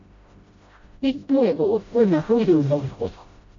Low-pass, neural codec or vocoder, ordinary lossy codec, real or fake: 7.2 kHz; codec, 16 kHz, 0.5 kbps, FreqCodec, smaller model; MP3, 48 kbps; fake